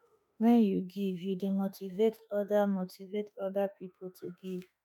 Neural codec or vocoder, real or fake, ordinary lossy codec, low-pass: autoencoder, 48 kHz, 32 numbers a frame, DAC-VAE, trained on Japanese speech; fake; none; 19.8 kHz